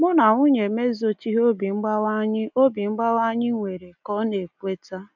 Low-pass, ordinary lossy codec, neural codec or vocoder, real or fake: 7.2 kHz; none; none; real